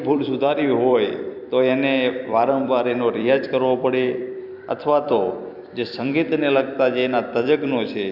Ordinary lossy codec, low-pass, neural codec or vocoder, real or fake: none; 5.4 kHz; vocoder, 44.1 kHz, 128 mel bands every 256 samples, BigVGAN v2; fake